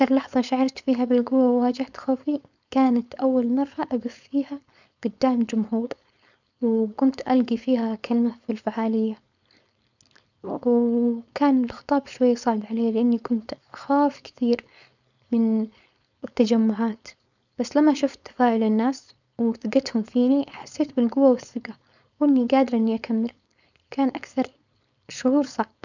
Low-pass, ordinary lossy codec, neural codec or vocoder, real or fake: 7.2 kHz; none; codec, 16 kHz, 4.8 kbps, FACodec; fake